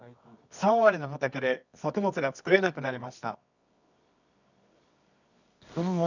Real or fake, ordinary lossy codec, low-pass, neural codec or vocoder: fake; none; 7.2 kHz; codec, 24 kHz, 0.9 kbps, WavTokenizer, medium music audio release